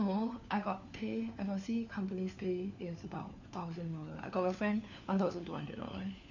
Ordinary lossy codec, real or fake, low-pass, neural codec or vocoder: none; fake; 7.2 kHz; codec, 16 kHz, 4 kbps, FunCodec, trained on LibriTTS, 50 frames a second